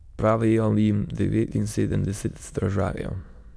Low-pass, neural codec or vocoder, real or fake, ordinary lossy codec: none; autoencoder, 22.05 kHz, a latent of 192 numbers a frame, VITS, trained on many speakers; fake; none